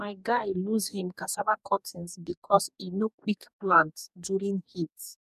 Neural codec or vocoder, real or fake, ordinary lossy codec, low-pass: codec, 44.1 kHz, 2.6 kbps, DAC; fake; none; 14.4 kHz